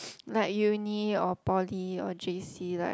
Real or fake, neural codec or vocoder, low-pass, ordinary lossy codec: real; none; none; none